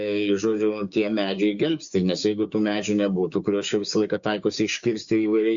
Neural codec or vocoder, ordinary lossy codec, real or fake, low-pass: codec, 16 kHz, 6 kbps, DAC; Opus, 64 kbps; fake; 7.2 kHz